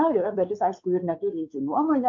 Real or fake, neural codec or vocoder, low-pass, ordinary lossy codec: fake; codec, 16 kHz, 4 kbps, X-Codec, WavLM features, trained on Multilingual LibriSpeech; 7.2 kHz; MP3, 64 kbps